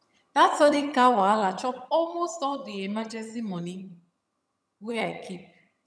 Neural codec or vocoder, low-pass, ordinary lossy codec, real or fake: vocoder, 22.05 kHz, 80 mel bands, HiFi-GAN; none; none; fake